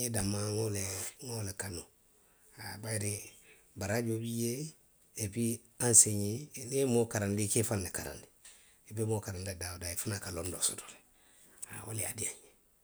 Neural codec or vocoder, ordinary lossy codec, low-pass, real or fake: none; none; none; real